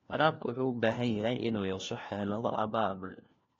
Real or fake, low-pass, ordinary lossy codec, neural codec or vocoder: fake; 7.2 kHz; AAC, 32 kbps; codec, 16 kHz, 1 kbps, FunCodec, trained on LibriTTS, 50 frames a second